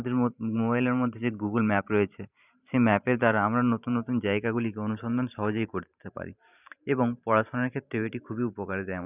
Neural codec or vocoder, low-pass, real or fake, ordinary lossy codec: vocoder, 44.1 kHz, 128 mel bands every 512 samples, BigVGAN v2; 3.6 kHz; fake; none